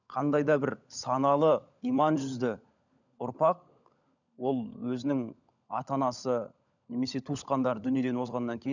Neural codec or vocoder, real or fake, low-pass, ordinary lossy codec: codec, 16 kHz, 16 kbps, FunCodec, trained on LibriTTS, 50 frames a second; fake; 7.2 kHz; none